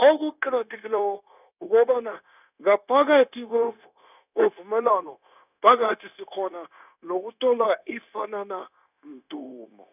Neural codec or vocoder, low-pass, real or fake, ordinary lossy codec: codec, 16 kHz, 0.9 kbps, LongCat-Audio-Codec; 3.6 kHz; fake; none